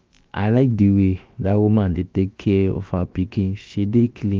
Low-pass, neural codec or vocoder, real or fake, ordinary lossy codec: 7.2 kHz; codec, 16 kHz, about 1 kbps, DyCAST, with the encoder's durations; fake; Opus, 24 kbps